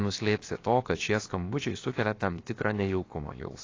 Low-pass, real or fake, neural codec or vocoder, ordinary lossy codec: 7.2 kHz; fake; codec, 16 kHz, 0.7 kbps, FocalCodec; AAC, 32 kbps